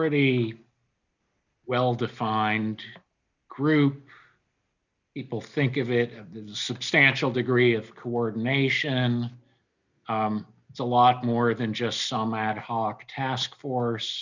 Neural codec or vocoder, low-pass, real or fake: none; 7.2 kHz; real